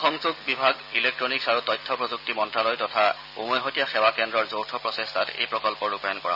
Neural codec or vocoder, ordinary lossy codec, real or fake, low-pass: none; none; real; 5.4 kHz